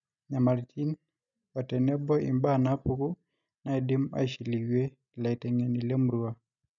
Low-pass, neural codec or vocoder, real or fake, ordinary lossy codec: 7.2 kHz; none; real; none